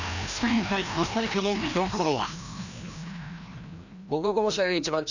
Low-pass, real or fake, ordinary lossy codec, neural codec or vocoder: 7.2 kHz; fake; none; codec, 16 kHz, 1 kbps, FreqCodec, larger model